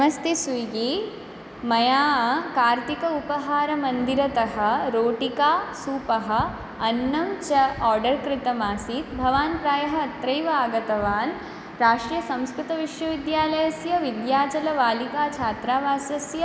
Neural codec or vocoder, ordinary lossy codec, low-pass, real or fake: none; none; none; real